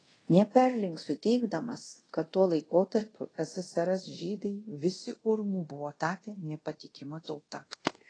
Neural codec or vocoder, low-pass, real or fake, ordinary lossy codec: codec, 24 kHz, 0.5 kbps, DualCodec; 9.9 kHz; fake; AAC, 32 kbps